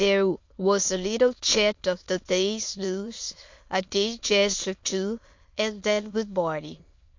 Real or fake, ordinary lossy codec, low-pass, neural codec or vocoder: fake; MP3, 48 kbps; 7.2 kHz; autoencoder, 22.05 kHz, a latent of 192 numbers a frame, VITS, trained on many speakers